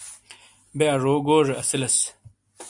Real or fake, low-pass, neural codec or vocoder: real; 10.8 kHz; none